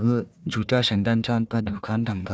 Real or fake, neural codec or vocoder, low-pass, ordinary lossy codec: fake; codec, 16 kHz, 1 kbps, FunCodec, trained on Chinese and English, 50 frames a second; none; none